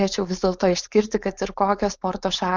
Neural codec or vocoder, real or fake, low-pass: codec, 16 kHz, 4.8 kbps, FACodec; fake; 7.2 kHz